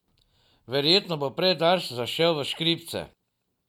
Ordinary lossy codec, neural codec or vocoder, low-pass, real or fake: none; none; 19.8 kHz; real